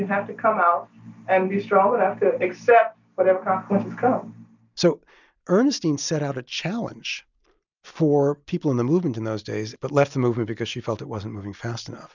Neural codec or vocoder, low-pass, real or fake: none; 7.2 kHz; real